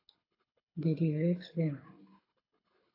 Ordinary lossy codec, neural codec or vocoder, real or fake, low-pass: AAC, 32 kbps; codec, 24 kHz, 6 kbps, HILCodec; fake; 5.4 kHz